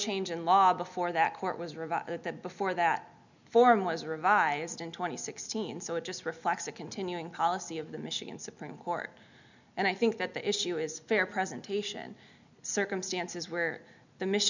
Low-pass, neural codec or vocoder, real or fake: 7.2 kHz; none; real